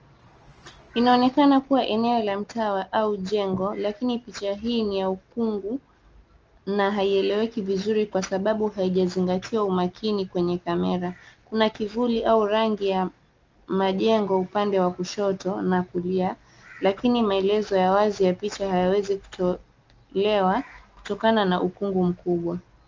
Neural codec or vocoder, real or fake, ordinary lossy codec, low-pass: none; real; Opus, 24 kbps; 7.2 kHz